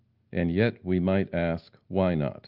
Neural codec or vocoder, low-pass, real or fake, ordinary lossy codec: none; 5.4 kHz; real; Opus, 32 kbps